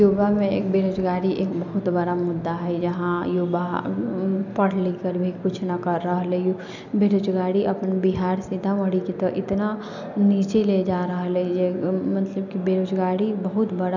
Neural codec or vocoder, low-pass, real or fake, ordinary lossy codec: none; 7.2 kHz; real; none